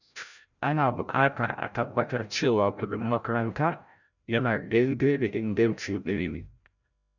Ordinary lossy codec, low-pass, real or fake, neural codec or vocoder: none; 7.2 kHz; fake; codec, 16 kHz, 0.5 kbps, FreqCodec, larger model